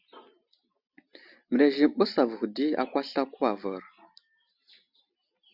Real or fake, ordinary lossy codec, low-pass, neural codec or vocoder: real; Opus, 64 kbps; 5.4 kHz; none